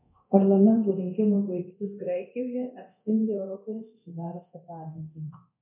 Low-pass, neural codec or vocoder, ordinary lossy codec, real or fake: 3.6 kHz; codec, 24 kHz, 0.9 kbps, DualCodec; MP3, 32 kbps; fake